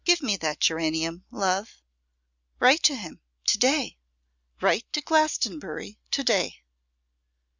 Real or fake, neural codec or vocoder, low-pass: real; none; 7.2 kHz